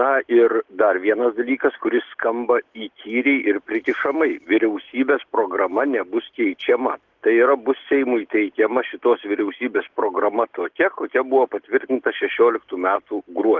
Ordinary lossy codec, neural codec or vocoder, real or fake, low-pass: Opus, 16 kbps; none; real; 7.2 kHz